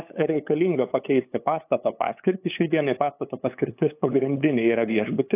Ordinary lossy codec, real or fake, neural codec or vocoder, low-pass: AAC, 24 kbps; fake; codec, 16 kHz, 8 kbps, FunCodec, trained on LibriTTS, 25 frames a second; 3.6 kHz